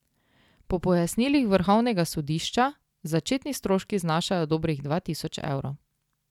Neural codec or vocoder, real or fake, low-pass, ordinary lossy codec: none; real; 19.8 kHz; none